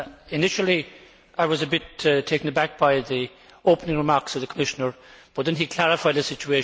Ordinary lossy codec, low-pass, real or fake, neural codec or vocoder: none; none; real; none